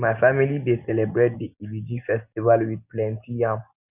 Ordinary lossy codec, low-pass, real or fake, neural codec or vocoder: none; 3.6 kHz; real; none